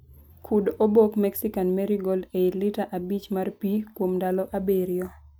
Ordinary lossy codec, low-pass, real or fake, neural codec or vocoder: none; none; real; none